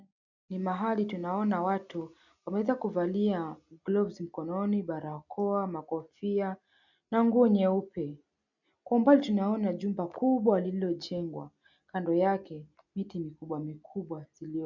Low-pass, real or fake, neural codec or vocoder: 7.2 kHz; real; none